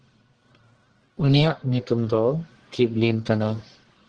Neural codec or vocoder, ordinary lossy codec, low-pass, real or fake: codec, 44.1 kHz, 1.7 kbps, Pupu-Codec; Opus, 16 kbps; 9.9 kHz; fake